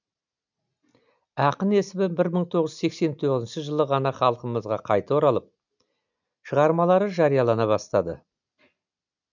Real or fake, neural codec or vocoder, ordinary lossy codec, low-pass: real; none; none; 7.2 kHz